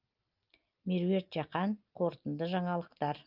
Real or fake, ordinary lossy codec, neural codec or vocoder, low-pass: real; Opus, 24 kbps; none; 5.4 kHz